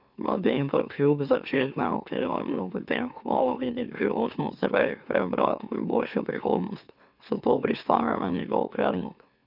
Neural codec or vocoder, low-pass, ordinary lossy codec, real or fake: autoencoder, 44.1 kHz, a latent of 192 numbers a frame, MeloTTS; 5.4 kHz; none; fake